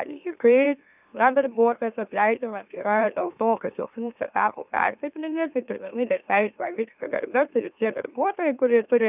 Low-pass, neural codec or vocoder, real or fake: 3.6 kHz; autoencoder, 44.1 kHz, a latent of 192 numbers a frame, MeloTTS; fake